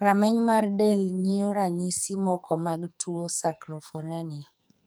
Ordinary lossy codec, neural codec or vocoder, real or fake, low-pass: none; codec, 44.1 kHz, 2.6 kbps, SNAC; fake; none